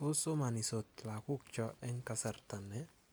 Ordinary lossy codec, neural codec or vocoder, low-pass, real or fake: none; none; none; real